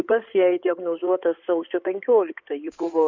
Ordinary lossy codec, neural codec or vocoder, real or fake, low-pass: MP3, 64 kbps; codec, 16 kHz in and 24 kHz out, 2.2 kbps, FireRedTTS-2 codec; fake; 7.2 kHz